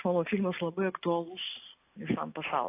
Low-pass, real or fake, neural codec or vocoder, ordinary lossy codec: 3.6 kHz; real; none; AAC, 24 kbps